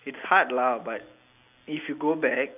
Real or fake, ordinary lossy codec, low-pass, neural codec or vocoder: real; none; 3.6 kHz; none